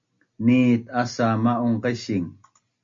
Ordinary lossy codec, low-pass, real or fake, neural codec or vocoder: MP3, 48 kbps; 7.2 kHz; real; none